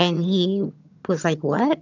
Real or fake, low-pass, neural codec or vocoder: fake; 7.2 kHz; vocoder, 22.05 kHz, 80 mel bands, HiFi-GAN